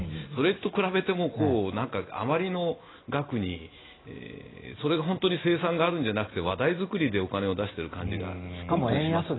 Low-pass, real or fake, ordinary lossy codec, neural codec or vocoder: 7.2 kHz; real; AAC, 16 kbps; none